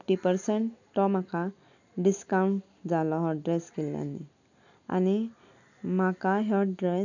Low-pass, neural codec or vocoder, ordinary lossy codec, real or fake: 7.2 kHz; none; none; real